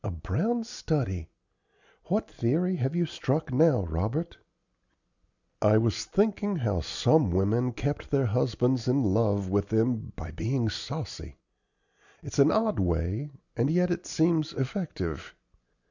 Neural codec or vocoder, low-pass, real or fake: none; 7.2 kHz; real